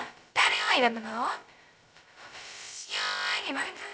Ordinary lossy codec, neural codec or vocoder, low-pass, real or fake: none; codec, 16 kHz, 0.2 kbps, FocalCodec; none; fake